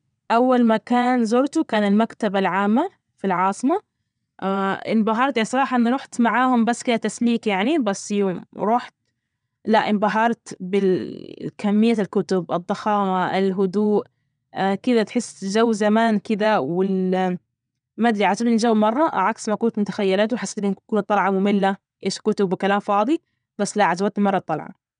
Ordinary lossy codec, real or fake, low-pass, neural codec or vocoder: none; fake; 9.9 kHz; vocoder, 22.05 kHz, 80 mel bands, WaveNeXt